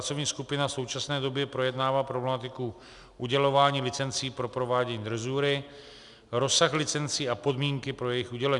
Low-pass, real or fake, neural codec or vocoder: 10.8 kHz; real; none